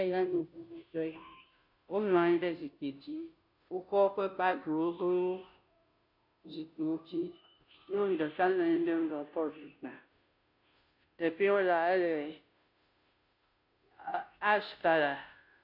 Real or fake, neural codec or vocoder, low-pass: fake; codec, 16 kHz, 0.5 kbps, FunCodec, trained on Chinese and English, 25 frames a second; 5.4 kHz